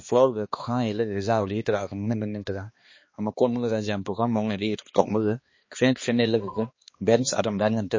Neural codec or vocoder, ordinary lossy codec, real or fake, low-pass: codec, 16 kHz, 2 kbps, X-Codec, HuBERT features, trained on balanced general audio; MP3, 32 kbps; fake; 7.2 kHz